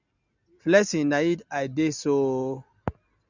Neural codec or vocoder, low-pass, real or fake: none; 7.2 kHz; real